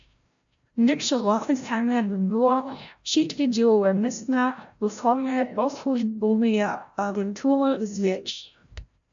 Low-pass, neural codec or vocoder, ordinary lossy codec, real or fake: 7.2 kHz; codec, 16 kHz, 0.5 kbps, FreqCodec, larger model; AAC, 64 kbps; fake